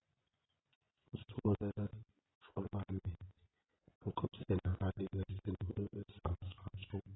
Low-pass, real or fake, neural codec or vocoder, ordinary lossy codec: 7.2 kHz; fake; vocoder, 24 kHz, 100 mel bands, Vocos; AAC, 16 kbps